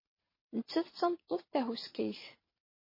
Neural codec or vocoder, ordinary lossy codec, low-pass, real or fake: vocoder, 22.05 kHz, 80 mel bands, WaveNeXt; MP3, 24 kbps; 5.4 kHz; fake